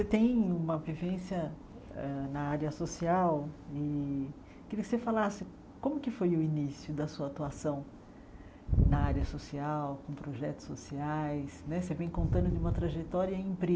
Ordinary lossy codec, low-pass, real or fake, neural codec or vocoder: none; none; real; none